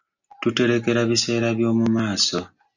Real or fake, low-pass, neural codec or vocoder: real; 7.2 kHz; none